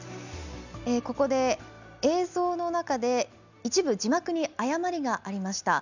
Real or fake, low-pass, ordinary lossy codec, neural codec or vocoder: real; 7.2 kHz; none; none